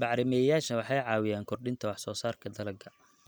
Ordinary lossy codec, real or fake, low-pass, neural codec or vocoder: none; real; none; none